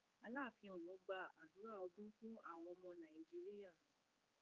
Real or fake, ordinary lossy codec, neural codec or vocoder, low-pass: fake; Opus, 24 kbps; codec, 16 kHz, 4 kbps, X-Codec, HuBERT features, trained on general audio; 7.2 kHz